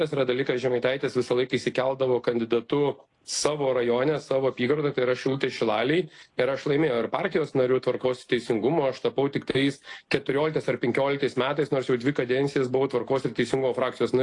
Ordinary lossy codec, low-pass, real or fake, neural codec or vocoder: AAC, 48 kbps; 10.8 kHz; real; none